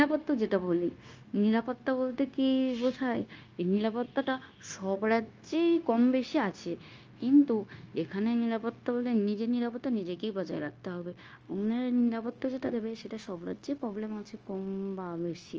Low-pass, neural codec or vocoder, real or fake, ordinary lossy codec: 7.2 kHz; codec, 16 kHz, 0.9 kbps, LongCat-Audio-Codec; fake; Opus, 24 kbps